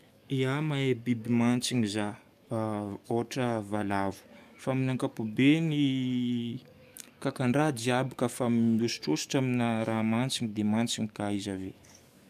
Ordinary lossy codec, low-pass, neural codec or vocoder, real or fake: none; 14.4 kHz; codec, 44.1 kHz, 7.8 kbps, DAC; fake